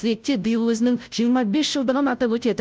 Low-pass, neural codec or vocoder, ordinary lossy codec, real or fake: none; codec, 16 kHz, 0.5 kbps, FunCodec, trained on Chinese and English, 25 frames a second; none; fake